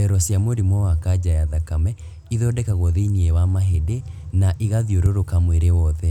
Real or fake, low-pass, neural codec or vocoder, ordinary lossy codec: real; 19.8 kHz; none; none